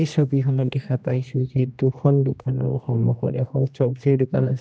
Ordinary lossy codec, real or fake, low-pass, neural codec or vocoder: none; fake; none; codec, 16 kHz, 1 kbps, X-Codec, HuBERT features, trained on general audio